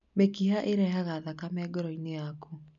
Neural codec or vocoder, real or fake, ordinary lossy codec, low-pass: none; real; none; 7.2 kHz